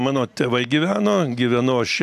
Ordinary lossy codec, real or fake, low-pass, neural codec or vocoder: AAC, 96 kbps; real; 14.4 kHz; none